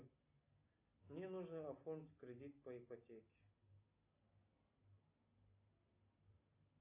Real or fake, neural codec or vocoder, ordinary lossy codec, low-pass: real; none; AAC, 24 kbps; 3.6 kHz